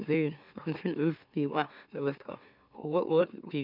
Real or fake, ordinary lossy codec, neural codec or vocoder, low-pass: fake; none; autoencoder, 44.1 kHz, a latent of 192 numbers a frame, MeloTTS; 5.4 kHz